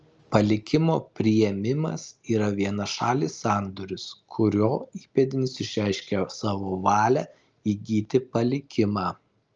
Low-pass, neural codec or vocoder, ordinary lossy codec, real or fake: 7.2 kHz; none; Opus, 24 kbps; real